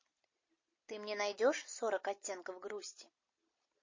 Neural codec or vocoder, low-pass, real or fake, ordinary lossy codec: none; 7.2 kHz; real; MP3, 32 kbps